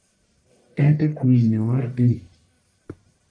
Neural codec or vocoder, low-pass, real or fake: codec, 44.1 kHz, 1.7 kbps, Pupu-Codec; 9.9 kHz; fake